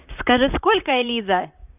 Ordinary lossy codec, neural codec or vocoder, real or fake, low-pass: none; vocoder, 44.1 kHz, 128 mel bands, Pupu-Vocoder; fake; 3.6 kHz